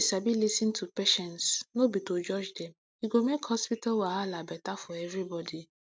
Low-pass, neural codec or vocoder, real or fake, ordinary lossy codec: none; none; real; none